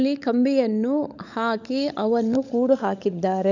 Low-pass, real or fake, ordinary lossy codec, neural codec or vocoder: 7.2 kHz; fake; none; codec, 16 kHz, 16 kbps, FunCodec, trained on LibriTTS, 50 frames a second